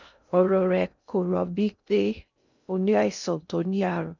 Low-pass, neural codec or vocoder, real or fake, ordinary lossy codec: 7.2 kHz; codec, 16 kHz in and 24 kHz out, 0.6 kbps, FocalCodec, streaming, 2048 codes; fake; none